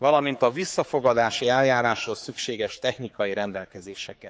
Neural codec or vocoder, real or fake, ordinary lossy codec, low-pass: codec, 16 kHz, 4 kbps, X-Codec, HuBERT features, trained on general audio; fake; none; none